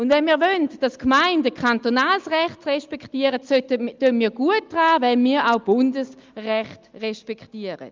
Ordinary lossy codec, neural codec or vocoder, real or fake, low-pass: Opus, 24 kbps; none; real; 7.2 kHz